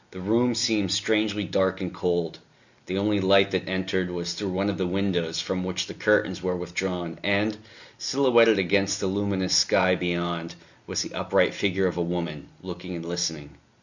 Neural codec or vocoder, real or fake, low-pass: none; real; 7.2 kHz